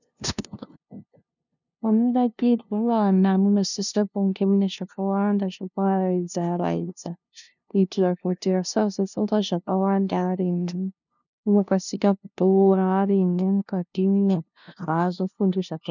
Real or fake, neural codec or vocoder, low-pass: fake; codec, 16 kHz, 0.5 kbps, FunCodec, trained on LibriTTS, 25 frames a second; 7.2 kHz